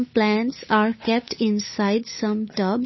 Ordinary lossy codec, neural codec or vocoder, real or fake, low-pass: MP3, 24 kbps; codec, 16 kHz, 8 kbps, FunCodec, trained on Chinese and English, 25 frames a second; fake; 7.2 kHz